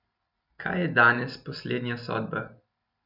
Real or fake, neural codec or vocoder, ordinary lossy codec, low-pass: real; none; none; 5.4 kHz